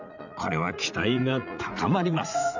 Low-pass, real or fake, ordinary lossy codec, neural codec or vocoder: 7.2 kHz; fake; none; vocoder, 44.1 kHz, 80 mel bands, Vocos